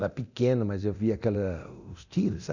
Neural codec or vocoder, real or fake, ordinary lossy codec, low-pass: codec, 24 kHz, 0.9 kbps, DualCodec; fake; none; 7.2 kHz